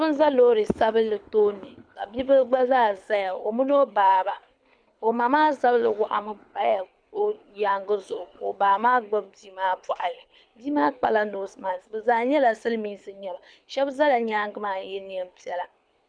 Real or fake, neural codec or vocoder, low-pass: fake; codec, 24 kHz, 6 kbps, HILCodec; 9.9 kHz